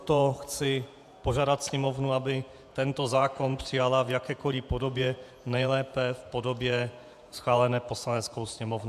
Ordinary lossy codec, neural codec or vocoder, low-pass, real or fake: MP3, 96 kbps; vocoder, 48 kHz, 128 mel bands, Vocos; 14.4 kHz; fake